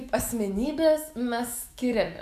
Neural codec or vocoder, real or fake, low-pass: autoencoder, 48 kHz, 128 numbers a frame, DAC-VAE, trained on Japanese speech; fake; 14.4 kHz